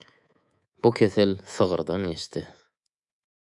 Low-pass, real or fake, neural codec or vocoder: 10.8 kHz; fake; codec, 24 kHz, 3.1 kbps, DualCodec